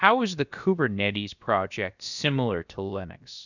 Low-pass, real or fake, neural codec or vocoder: 7.2 kHz; fake; codec, 16 kHz, about 1 kbps, DyCAST, with the encoder's durations